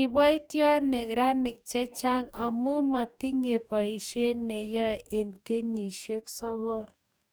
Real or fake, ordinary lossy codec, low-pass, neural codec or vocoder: fake; none; none; codec, 44.1 kHz, 2.6 kbps, DAC